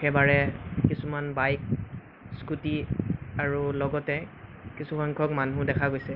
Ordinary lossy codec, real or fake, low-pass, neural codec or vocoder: Opus, 24 kbps; real; 5.4 kHz; none